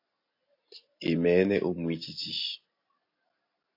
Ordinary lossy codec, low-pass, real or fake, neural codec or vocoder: AAC, 32 kbps; 5.4 kHz; real; none